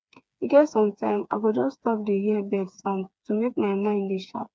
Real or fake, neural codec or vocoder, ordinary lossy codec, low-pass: fake; codec, 16 kHz, 4 kbps, FreqCodec, smaller model; none; none